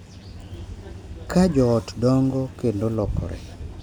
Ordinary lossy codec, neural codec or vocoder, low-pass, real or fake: none; none; 19.8 kHz; real